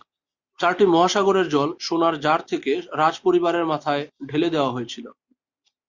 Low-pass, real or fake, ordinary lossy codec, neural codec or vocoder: 7.2 kHz; real; Opus, 64 kbps; none